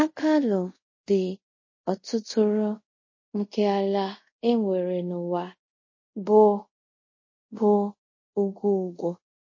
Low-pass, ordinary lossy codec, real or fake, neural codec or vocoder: 7.2 kHz; MP3, 32 kbps; fake; codec, 24 kHz, 0.5 kbps, DualCodec